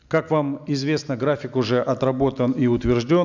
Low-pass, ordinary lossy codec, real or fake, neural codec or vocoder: 7.2 kHz; none; real; none